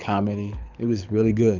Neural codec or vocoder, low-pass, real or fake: codec, 24 kHz, 6 kbps, HILCodec; 7.2 kHz; fake